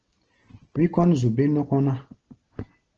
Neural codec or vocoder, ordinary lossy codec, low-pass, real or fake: none; Opus, 16 kbps; 7.2 kHz; real